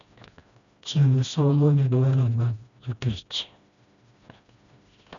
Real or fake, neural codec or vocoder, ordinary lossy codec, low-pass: fake; codec, 16 kHz, 1 kbps, FreqCodec, smaller model; none; 7.2 kHz